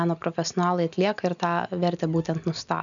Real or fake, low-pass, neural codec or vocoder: real; 7.2 kHz; none